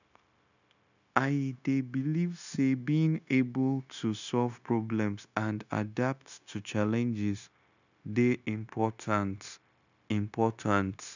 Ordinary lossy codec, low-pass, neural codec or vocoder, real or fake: none; 7.2 kHz; codec, 16 kHz, 0.9 kbps, LongCat-Audio-Codec; fake